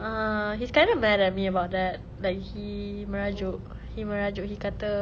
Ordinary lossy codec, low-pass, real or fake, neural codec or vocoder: none; none; real; none